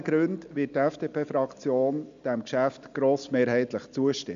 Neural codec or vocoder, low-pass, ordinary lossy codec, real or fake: none; 7.2 kHz; MP3, 64 kbps; real